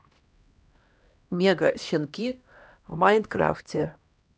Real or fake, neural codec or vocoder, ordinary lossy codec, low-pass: fake; codec, 16 kHz, 1 kbps, X-Codec, HuBERT features, trained on LibriSpeech; none; none